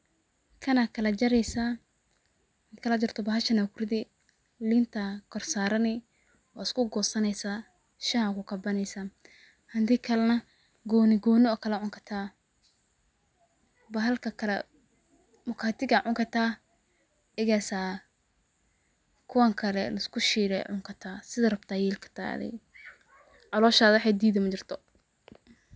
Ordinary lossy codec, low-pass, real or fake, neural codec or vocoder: none; none; real; none